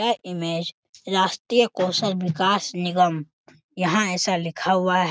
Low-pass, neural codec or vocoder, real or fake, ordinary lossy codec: none; none; real; none